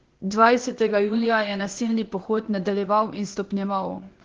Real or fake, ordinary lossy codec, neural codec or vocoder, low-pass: fake; Opus, 16 kbps; codec, 16 kHz, 0.8 kbps, ZipCodec; 7.2 kHz